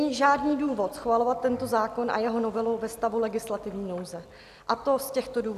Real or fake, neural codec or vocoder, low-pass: real; none; 14.4 kHz